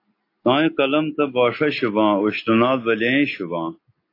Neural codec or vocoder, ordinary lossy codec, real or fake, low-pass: none; AAC, 32 kbps; real; 5.4 kHz